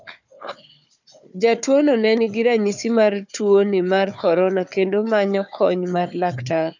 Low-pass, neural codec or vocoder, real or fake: 7.2 kHz; codec, 16 kHz, 4 kbps, FunCodec, trained on Chinese and English, 50 frames a second; fake